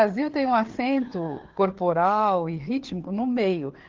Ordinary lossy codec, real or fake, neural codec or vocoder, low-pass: Opus, 16 kbps; fake; codec, 16 kHz, 4 kbps, FreqCodec, larger model; 7.2 kHz